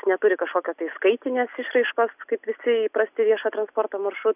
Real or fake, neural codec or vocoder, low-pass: real; none; 3.6 kHz